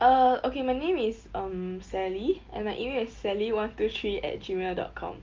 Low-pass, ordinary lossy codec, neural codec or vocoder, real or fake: 7.2 kHz; Opus, 24 kbps; none; real